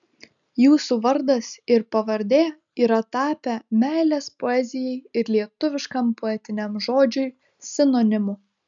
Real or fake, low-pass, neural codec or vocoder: real; 7.2 kHz; none